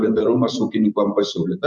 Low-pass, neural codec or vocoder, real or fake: 10.8 kHz; vocoder, 44.1 kHz, 128 mel bands every 256 samples, BigVGAN v2; fake